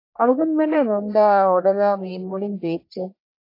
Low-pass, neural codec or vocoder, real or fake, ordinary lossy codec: 5.4 kHz; codec, 44.1 kHz, 1.7 kbps, Pupu-Codec; fake; AAC, 32 kbps